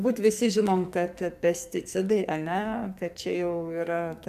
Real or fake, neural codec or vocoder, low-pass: fake; codec, 44.1 kHz, 2.6 kbps, SNAC; 14.4 kHz